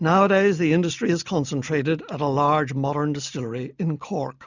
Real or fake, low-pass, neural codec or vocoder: real; 7.2 kHz; none